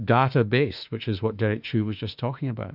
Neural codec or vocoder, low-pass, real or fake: autoencoder, 48 kHz, 32 numbers a frame, DAC-VAE, trained on Japanese speech; 5.4 kHz; fake